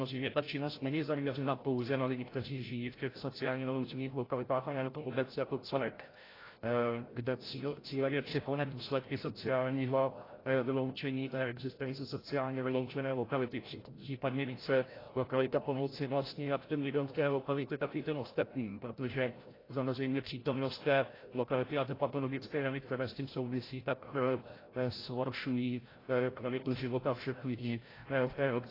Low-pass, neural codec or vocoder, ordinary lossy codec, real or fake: 5.4 kHz; codec, 16 kHz, 0.5 kbps, FreqCodec, larger model; AAC, 24 kbps; fake